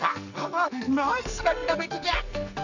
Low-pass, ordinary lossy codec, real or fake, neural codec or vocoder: 7.2 kHz; none; fake; codec, 44.1 kHz, 2.6 kbps, SNAC